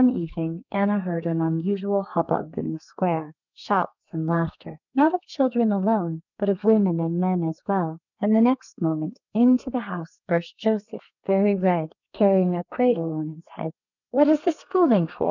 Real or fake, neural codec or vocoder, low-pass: fake; codec, 32 kHz, 1.9 kbps, SNAC; 7.2 kHz